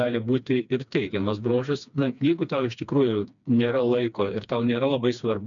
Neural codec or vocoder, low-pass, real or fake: codec, 16 kHz, 2 kbps, FreqCodec, smaller model; 7.2 kHz; fake